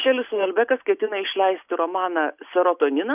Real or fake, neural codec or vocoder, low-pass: real; none; 3.6 kHz